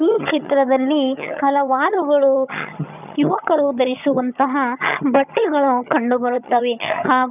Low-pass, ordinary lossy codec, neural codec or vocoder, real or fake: 3.6 kHz; none; vocoder, 22.05 kHz, 80 mel bands, HiFi-GAN; fake